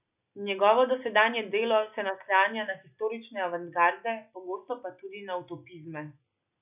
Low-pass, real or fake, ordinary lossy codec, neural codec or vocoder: 3.6 kHz; real; none; none